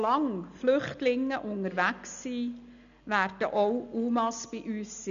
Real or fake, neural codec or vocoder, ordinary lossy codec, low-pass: real; none; none; 7.2 kHz